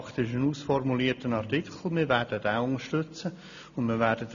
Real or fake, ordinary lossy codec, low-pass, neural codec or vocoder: real; none; 7.2 kHz; none